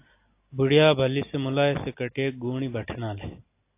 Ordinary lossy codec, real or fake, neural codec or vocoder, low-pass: AAC, 24 kbps; real; none; 3.6 kHz